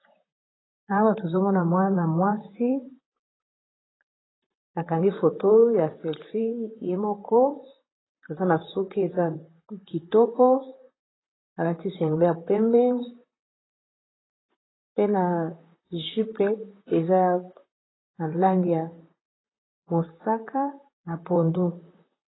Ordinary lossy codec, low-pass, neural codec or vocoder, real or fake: AAC, 16 kbps; 7.2 kHz; vocoder, 44.1 kHz, 128 mel bands, Pupu-Vocoder; fake